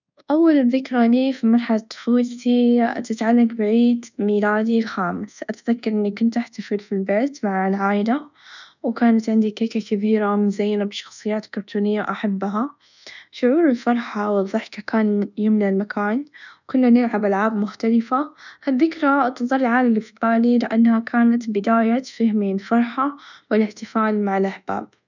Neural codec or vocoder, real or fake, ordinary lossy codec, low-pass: codec, 24 kHz, 1.2 kbps, DualCodec; fake; none; 7.2 kHz